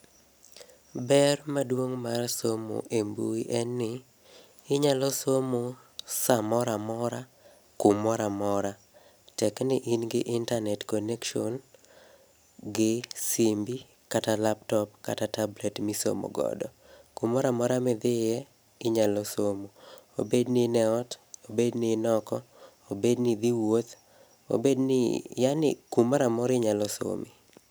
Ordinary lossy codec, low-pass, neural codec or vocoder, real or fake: none; none; none; real